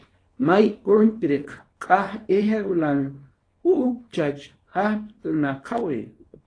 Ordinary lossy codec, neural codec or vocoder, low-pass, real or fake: AAC, 32 kbps; codec, 24 kHz, 0.9 kbps, WavTokenizer, small release; 9.9 kHz; fake